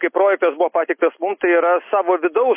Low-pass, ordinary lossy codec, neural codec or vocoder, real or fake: 3.6 kHz; MP3, 24 kbps; none; real